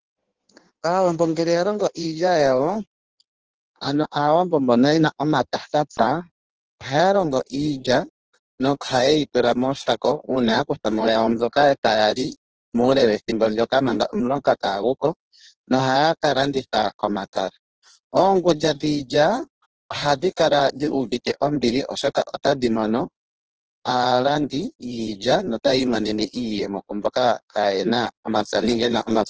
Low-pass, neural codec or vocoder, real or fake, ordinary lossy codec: 7.2 kHz; codec, 16 kHz in and 24 kHz out, 1.1 kbps, FireRedTTS-2 codec; fake; Opus, 16 kbps